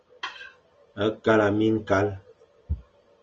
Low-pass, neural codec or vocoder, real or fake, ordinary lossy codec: 7.2 kHz; none; real; Opus, 24 kbps